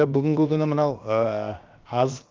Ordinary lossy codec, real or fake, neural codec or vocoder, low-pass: Opus, 32 kbps; fake; codec, 16 kHz, 0.7 kbps, FocalCodec; 7.2 kHz